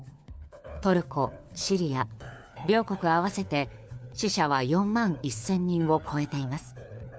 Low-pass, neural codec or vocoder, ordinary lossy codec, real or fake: none; codec, 16 kHz, 4 kbps, FunCodec, trained on LibriTTS, 50 frames a second; none; fake